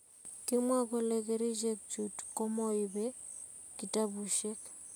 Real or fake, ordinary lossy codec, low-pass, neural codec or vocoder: real; none; none; none